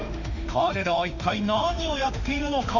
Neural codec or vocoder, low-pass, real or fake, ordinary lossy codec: autoencoder, 48 kHz, 32 numbers a frame, DAC-VAE, trained on Japanese speech; 7.2 kHz; fake; none